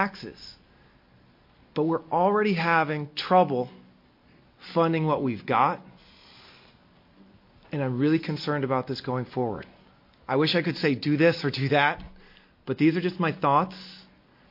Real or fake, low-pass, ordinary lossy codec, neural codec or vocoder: real; 5.4 kHz; MP3, 32 kbps; none